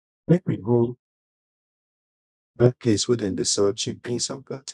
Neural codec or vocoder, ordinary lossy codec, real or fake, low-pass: codec, 24 kHz, 0.9 kbps, WavTokenizer, medium music audio release; none; fake; none